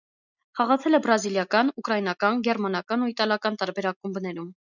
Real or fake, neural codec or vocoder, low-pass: real; none; 7.2 kHz